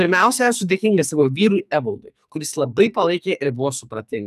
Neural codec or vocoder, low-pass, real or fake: codec, 44.1 kHz, 2.6 kbps, SNAC; 14.4 kHz; fake